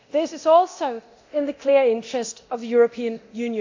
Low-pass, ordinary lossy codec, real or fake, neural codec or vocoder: 7.2 kHz; AAC, 48 kbps; fake; codec, 24 kHz, 0.9 kbps, DualCodec